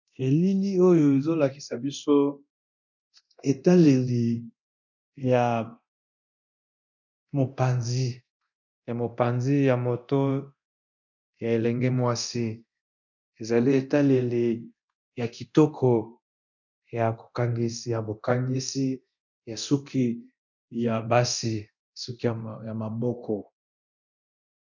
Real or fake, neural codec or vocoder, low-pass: fake; codec, 24 kHz, 0.9 kbps, DualCodec; 7.2 kHz